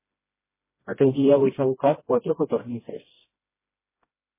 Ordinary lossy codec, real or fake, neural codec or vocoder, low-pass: MP3, 16 kbps; fake; codec, 16 kHz, 1 kbps, FreqCodec, smaller model; 3.6 kHz